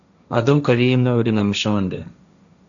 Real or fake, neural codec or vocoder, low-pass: fake; codec, 16 kHz, 1.1 kbps, Voila-Tokenizer; 7.2 kHz